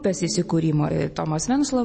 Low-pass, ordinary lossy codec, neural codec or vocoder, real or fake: 9.9 kHz; MP3, 32 kbps; none; real